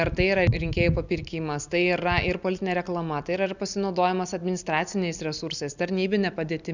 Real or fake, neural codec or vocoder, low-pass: real; none; 7.2 kHz